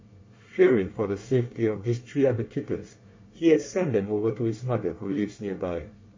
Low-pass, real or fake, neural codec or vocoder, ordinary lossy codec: 7.2 kHz; fake; codec, 24 kHz, 1 kbps, SNAC; MP3, 32 kbps